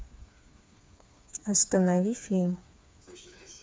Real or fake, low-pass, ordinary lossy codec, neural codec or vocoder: fake; none; none; codec, 16 kHz, 4 kbps, FreqCodec, smaller model